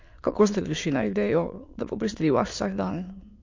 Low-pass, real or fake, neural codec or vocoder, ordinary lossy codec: 7.2 kHz; fake; autoencoder, 22.05 kHz, a latent of 192 numbers a frame, VITS, trained on many speakers; MP3, 48 kbps